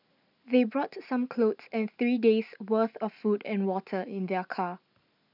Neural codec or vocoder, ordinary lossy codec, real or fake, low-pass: none; none; real; 5.4 kHz